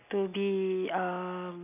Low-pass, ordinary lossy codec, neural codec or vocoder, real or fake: 3.6 kHz; none; none; real